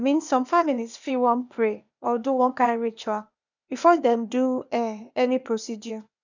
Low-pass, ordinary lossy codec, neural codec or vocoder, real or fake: 7.2 kHz; none; codec, 16 kHz, 0.8 kbps, ZipCodec; fake